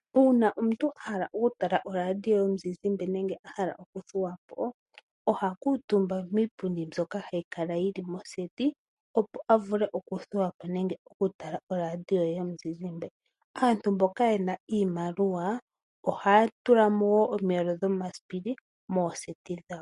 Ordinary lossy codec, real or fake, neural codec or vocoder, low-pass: MP3, 48 kbps; real; none; 14.4 kHz